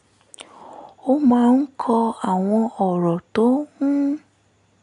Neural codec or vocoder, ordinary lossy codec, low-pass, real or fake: none; none; 10.8 kHz; real